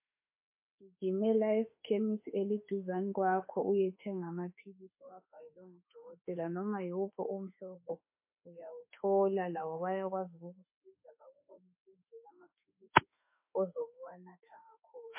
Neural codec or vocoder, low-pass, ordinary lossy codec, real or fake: autoencoder, 48 kHz, 32 numbers a frame, DAC-VAE, trained on Japanese speech; 3.6 kHz; MP3, 24 kbps; fake